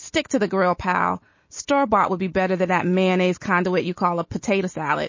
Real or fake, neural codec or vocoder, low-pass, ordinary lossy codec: real; none; 7.2 kHz; MP3, 32 kbps